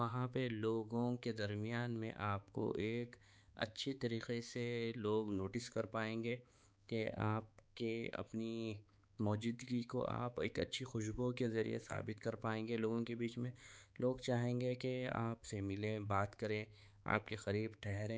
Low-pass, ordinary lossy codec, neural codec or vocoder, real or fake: none; none; codec, 16 kHz, 4 kbps, X-Codec, HuBERT features, trained on balanced general audio; fake